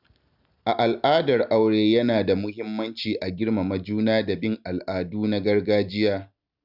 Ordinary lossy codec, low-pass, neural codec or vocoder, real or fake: none; 5.4 kHz; none; real